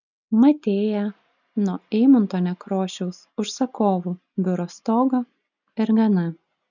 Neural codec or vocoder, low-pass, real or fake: none; 7.2 kHz; real